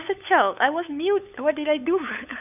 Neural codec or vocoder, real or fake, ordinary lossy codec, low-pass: codec, 16 kHz, 8 kbps, FunCodec, trained on LibriTTS, 25 frames a second; fake; none; 3.6 kHz